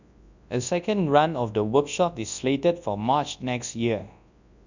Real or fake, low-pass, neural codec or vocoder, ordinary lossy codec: fake; 7.2 kHz; codec, 24 kHz, 0.9 kbps, WavTokenizer, large speech release; none